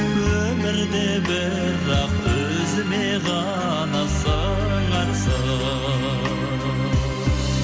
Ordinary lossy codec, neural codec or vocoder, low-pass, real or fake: none; none; none; real